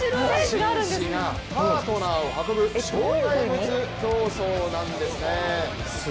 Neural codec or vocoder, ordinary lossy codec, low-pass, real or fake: none; none; none; real